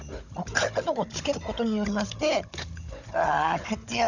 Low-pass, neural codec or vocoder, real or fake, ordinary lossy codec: 7.2 kHz; codec, 16 kHz, 16 kbps, FunCodec, trained on Chinese and English, 50 frames a second; fake; none